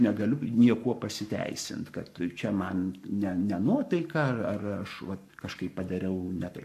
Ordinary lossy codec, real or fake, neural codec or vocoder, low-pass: AAC, 96 kbps; fake; codec, 44.1 kHz, 7.8 kbps, Pupu-Codec; 14.4 kHz